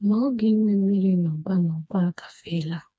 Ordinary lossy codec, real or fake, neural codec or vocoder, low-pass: none; fake; codec, 16 kHz, 2 kbps, FreqCodec, smaller model; none